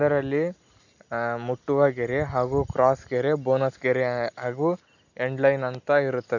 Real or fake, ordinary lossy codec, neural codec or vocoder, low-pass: real; none; none; 7.2 kHz